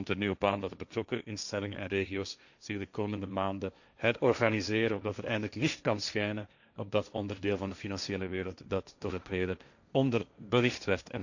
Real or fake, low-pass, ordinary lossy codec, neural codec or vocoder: fake; 7.2 kHz; none; codec, 16 kHz, 1.1 kbps, Voila-Tokenizer